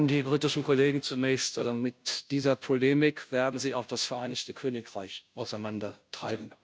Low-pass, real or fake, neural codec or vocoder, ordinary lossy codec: none; fake; codec, 16 kHz, 0.5 kbps, FunCodec, trained on Chinese and English, 25 frames a second; none